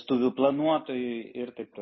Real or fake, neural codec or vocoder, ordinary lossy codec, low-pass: real; none; MP3, 24 kbps; 7.2 kHz